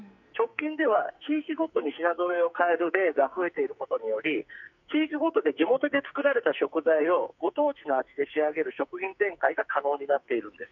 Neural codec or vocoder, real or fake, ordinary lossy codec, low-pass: codec, 44.1 kHz, 2.6 kbps, SNAC; fake; none; 7.2 kHz